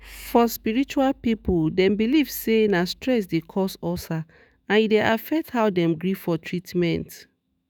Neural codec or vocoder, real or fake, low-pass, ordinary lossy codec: autoencoder, 48 kHz, 128 numbers a frame, DAC-VAE, trained on Japanese speech; fake; none; none